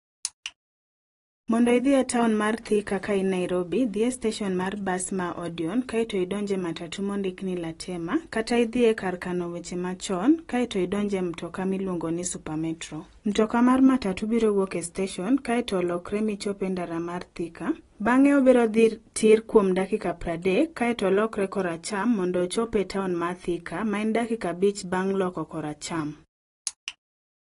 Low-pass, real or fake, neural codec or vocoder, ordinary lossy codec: 10.8 kHz; real; none; AAC, 32 kbps